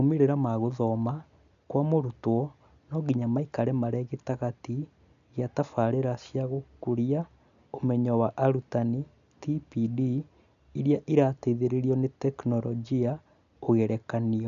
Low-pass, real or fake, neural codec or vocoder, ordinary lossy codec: 7.2 kHz; real; none; none